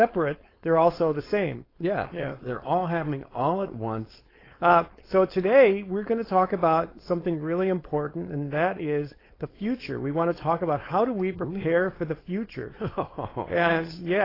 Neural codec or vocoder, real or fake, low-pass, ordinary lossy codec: codec, 16 kHz, 4.8 kbps, FACodec; fake; 5.4 kHz; AAC, 24 kbps